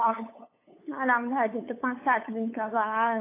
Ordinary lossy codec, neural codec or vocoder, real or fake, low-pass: AAC, 24 kbps; codec, 16 kHz, 8 kbps, FunCodec, trained on LibriTTS, 25 frames a second; fake; 3.6 kHz